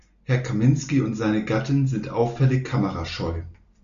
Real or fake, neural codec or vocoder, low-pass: real; none; 7.2 kHz